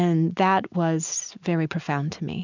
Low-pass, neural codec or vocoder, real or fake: 7.2 kHz; none; real